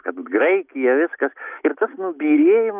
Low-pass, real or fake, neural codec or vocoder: 3.6 kHz; real; none